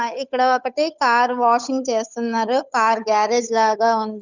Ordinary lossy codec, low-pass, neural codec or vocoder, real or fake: none; 7.2 kHz; codec, 16 kHz, 8 kbps, FunCodec, trained on Chinese and English, 25 frames a second; fake